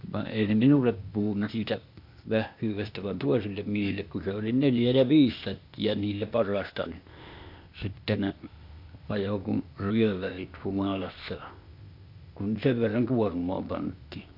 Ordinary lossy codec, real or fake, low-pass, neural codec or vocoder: MP3, 48 kbps; fake; 5.4 kHz; codec, 16 kHz, 0.8 kbps, ZipCodec